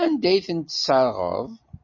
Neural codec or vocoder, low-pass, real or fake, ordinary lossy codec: none; 7.2 kHz; real; MP3, 32 kbps